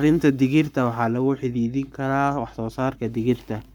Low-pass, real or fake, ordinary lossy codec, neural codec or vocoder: 19.8 kHz; fake; none; codec, 44.1 kHz, 7.8 kbps, Pupu-Codec